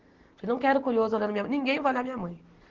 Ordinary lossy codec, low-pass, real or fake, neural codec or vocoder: Opus, 16 kbps; 7.2 kHz; real; none